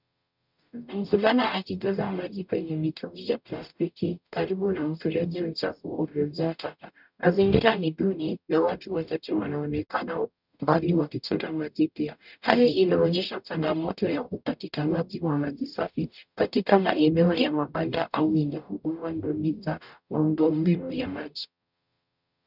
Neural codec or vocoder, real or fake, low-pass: codec, 44.1 kHz, 0.9 kbps, DAC; fake; 5.4 kHz